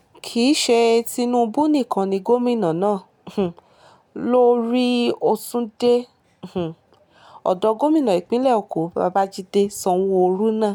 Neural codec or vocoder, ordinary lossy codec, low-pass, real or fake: none; none; 19.8 kHz; real